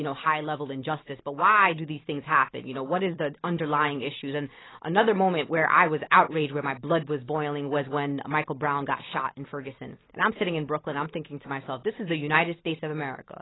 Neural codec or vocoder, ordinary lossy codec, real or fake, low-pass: none; AAC, 16 kbps; real; 7.2 kHz